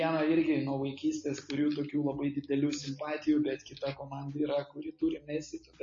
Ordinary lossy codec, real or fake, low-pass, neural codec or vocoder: MP3, 32 kbps; real; 7.2 kHz; none